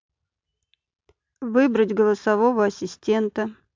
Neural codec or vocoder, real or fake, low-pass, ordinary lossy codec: none; real; 7.2 kHz; MP3, 64 kbps